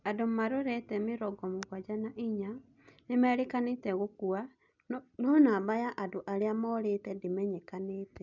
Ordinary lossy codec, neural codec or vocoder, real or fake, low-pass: none; none; real; 7.2 kHz